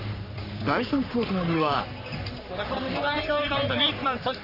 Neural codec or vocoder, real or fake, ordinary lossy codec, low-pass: codec, 44.1 kHz, 3.4 kbps, Pupu-Codec; fake; none; 5.4 kHz